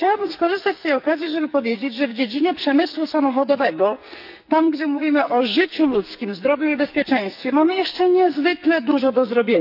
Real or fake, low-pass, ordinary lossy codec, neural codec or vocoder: fake; 5.4 kHz; none; codec, 44.1 kHz, 2.6 kbps, SNAC